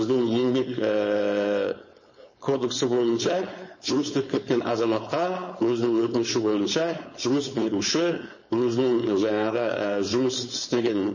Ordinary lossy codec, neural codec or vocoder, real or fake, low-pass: MP3, 32 kbps; codec, 16 kHz, 4.8 kbps, FACodec; fake; 7.2 kHz